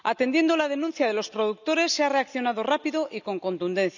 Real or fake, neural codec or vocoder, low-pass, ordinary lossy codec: real; none; 7.2 kHz; none